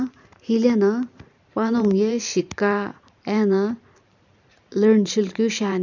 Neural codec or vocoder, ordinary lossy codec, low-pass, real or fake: vocoder, 44.1 kHz, 128 mel bands every 512 samples, BigVGAN v2; none; 7.2 kHz; fake